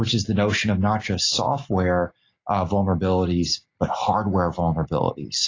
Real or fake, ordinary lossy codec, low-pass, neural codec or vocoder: real; AAC, 32 kbps; 7.2 kHz; none